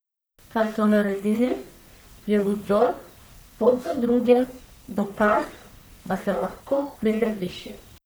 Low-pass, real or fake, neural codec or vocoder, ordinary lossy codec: none; fake; codec, 44.1 kHz, 1.7 kbps, Pupu-Codec; none